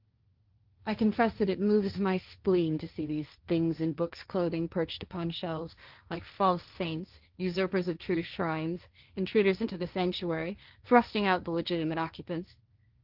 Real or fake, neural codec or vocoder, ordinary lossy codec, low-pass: fake; codec, 16 kHz, 1.1 kbps, Voila-Tokenizer; Opus, 32 kbps; 5.4 kHz